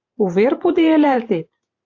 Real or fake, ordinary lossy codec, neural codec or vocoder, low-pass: fake; AAC, 48 kbps; vocoder, 44.1 kHz, 128 mel bands every 512 samples, BigVGAN v2; 7.2 kHz